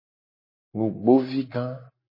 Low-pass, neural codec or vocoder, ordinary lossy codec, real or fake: 5.4 kHz; none; MP3, 24 kbps; real